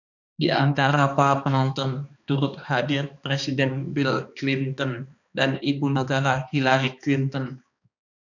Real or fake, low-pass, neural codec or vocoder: fake; 7.2 kHz; codec, 16 kHz, 2 kbps, X-Codec, HuBERT features, trained on balanced general audio